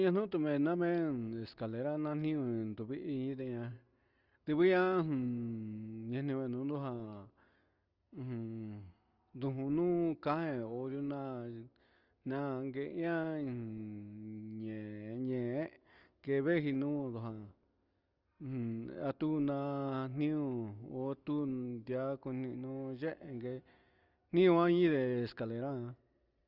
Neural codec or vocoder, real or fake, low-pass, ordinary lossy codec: none; real; 5.4 kHz; Opus, 24 kbps